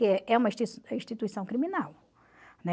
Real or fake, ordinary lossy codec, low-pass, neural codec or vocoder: real; none; none; none